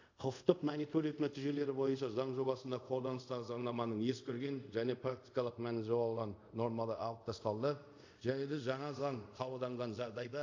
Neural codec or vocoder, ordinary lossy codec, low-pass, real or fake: codec, 24 kHz, 0.5 kbps, DualCodec; AAC, 48 kbps; 7.2 kHz; fake